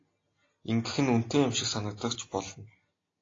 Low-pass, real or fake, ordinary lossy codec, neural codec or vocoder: 7.2 kHz; real; AAC, 32 kbps; none